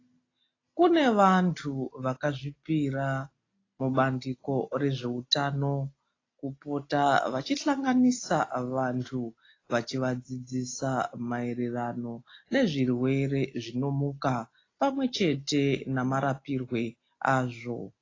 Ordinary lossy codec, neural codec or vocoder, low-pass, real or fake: AAC, 32 kbps; none; 7.2 kHz; real